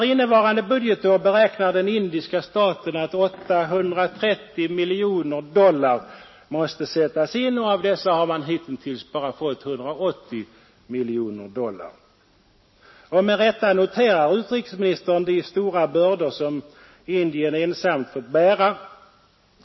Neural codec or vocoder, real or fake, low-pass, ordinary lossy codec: none; real; 7.2 kHz; MP3, 24 kbps